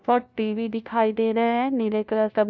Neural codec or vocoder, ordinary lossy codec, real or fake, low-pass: codec, 16 kHz, 1 kbps, FunCodec, trained on LibriTTS, 50 frames a second; none; fake; none